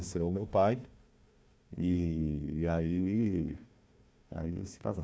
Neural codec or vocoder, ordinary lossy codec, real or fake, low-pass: codec, 16 kHz, 1 kbps, FunCodec, trained on Chinese and English, 50 frames a second; none; fake; none